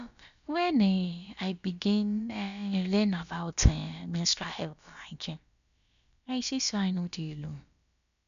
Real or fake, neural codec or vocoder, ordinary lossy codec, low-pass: fake; codec, 16 kHz, about 1 kbps, DyCAST, with the encoder's durations; none; 7.2 kHz